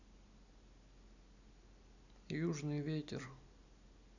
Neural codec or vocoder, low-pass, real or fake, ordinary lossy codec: none; 7.2 kHz; real; none